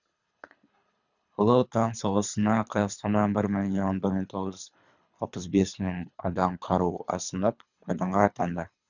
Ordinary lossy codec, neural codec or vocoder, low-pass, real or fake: none; codec, 24 kHz, 3 kbps, HILCodec; 7.2 kHz; fake